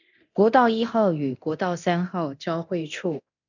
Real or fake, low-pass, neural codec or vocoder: fake; 7.2 kHz; codec, 24 kHz, 0.9 kbps, DualCodec